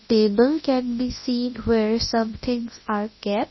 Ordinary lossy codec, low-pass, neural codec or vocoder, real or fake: MP3, 24 kbps; 7.2 kHz; codec, 24 kHz, 0.9 kbps, WavTokenizer, large speech release; fake